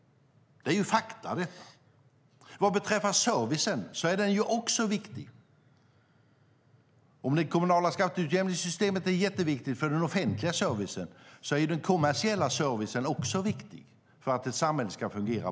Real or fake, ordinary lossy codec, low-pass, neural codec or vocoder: real; none; none; none